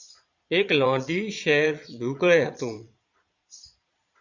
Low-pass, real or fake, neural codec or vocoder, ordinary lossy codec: 7.2 kHz; fake; vocoder, 22.05 kHz, 80 mel bands, Vocos; Opus, 64 kbps